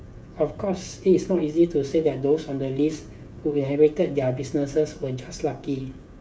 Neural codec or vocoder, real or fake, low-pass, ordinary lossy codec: codec, 16 kHz, 16 kbps, FreqCodec, smaller model; fake; none; none